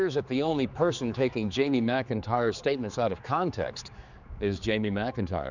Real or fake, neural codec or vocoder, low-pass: fake; codec, 16 kHz, 4 kbps, X-Codec, HuBERT features, trained on general audio; 7.2 kHz